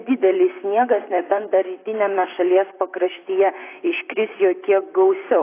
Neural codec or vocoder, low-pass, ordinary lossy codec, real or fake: vocoder, 44.1 kHz, 128 mel bands, Pupu-Vocoder; 3.6 kHz; AAC, 24 kbps; fake